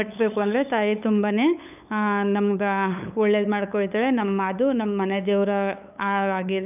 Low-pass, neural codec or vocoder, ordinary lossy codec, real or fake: 3.6 kHz; codec, 16 kHz, 8 kbps, FunCodec, trained on LibriTTS, 25 frames a second; none; fake